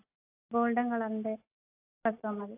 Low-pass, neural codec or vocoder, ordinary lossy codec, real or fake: 3.6 kHz; none; AAC, 32 kbps; real